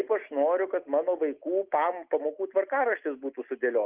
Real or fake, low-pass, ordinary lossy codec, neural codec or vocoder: real; 3.6 kHz; Opus, 32 kbps; none